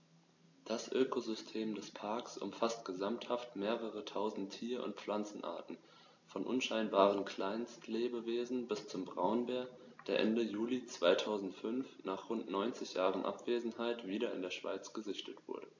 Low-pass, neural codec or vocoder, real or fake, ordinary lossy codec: 7.2 kHz; none; real; AAC, 48 kbps